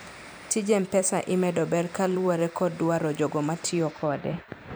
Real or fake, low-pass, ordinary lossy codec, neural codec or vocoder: fake; none; none; vocoder, 44.1 kHz, 128 mel bands every 256 samples, BigVGAN v2